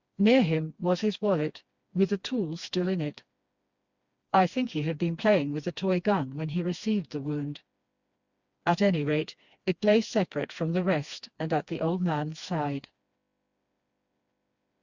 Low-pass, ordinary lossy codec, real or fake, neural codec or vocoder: 7.2 kHz; Opus, 64 kbps; fake; codec, 16 kHz, 2 kbps, FreqCodec, smaller model